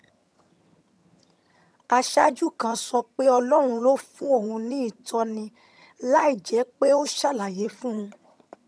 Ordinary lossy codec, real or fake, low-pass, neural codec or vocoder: none; fake; none; vocoder, 22.05 kHz, 80 mel bands, HiFi-GAN